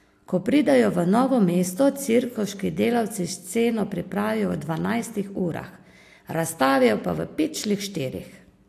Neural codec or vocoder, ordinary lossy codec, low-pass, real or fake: none; AAC, 64 kbps; 14.4 kHz; real